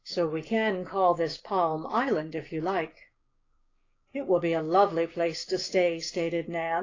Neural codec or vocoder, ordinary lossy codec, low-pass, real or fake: codec, 16 kHz, 6 kbps, DAC; AAC, 32 kbps; 7.2 kHz; fake